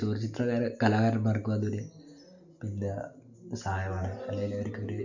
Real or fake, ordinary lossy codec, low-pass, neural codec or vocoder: real; none; 7.2 kHz; none